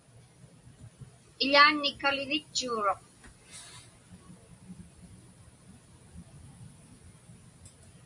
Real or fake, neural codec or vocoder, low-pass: real; none; 10.8 kHz